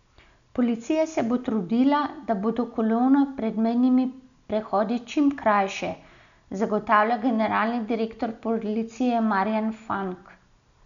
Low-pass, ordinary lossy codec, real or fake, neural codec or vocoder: 7.2 kHz; none; real; none